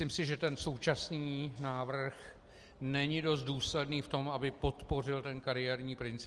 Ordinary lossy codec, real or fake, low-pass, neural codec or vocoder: Opus, 24 kbps; real; 10.8 kHz; none